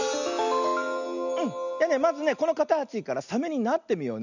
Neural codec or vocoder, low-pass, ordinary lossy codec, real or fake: none; 7.2 kHz; none; real